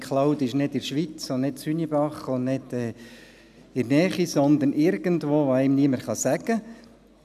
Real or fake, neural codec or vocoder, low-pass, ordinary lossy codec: real; none; 14.4 kHz; none